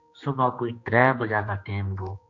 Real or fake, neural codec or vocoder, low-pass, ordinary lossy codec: fake; codec, 16 kHz, 2 kbps, X-Codec, HuBERT features, trained on general audio; 7.2 kHz; AAC, 48 kbps